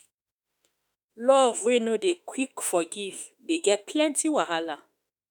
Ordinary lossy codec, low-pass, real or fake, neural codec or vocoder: none; none; fake; autoencoder, 48 kHz, 32 numbers a frame, DAC-VAE, trained on Japanese speech